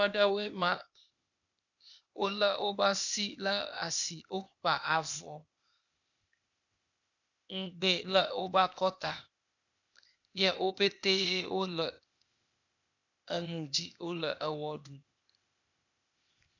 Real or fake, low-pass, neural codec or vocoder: fake; 7.2 kHz; codec, 16 kHz, 0.8 kbps, ZipCodec